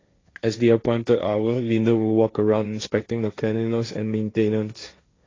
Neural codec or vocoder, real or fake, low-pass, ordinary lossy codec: codec, 16 kHz, 1.1 kbps, Voila-Tokenizer; fake; 7.2 kHz; AAC, 32 kbps